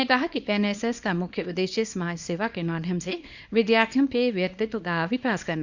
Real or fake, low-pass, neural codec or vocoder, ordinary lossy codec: fake; 7.2 kHz; codec, 24 kHz, 0.9 kbps, WavTokenizer, small release; Opus, 64 kbps